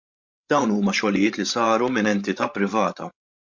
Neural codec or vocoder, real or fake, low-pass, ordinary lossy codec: codec, 16 kHz, 16 kbps, FreqCodec, larger model; fake; 7.2 kHz; MP3, 48 kbps